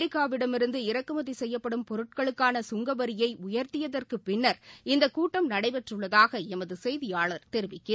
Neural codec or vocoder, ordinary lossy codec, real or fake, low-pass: none; none; real; none